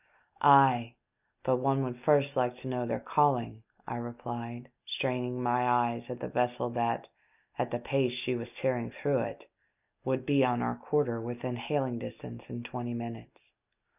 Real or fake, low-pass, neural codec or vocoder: real; 3.6 kHz; none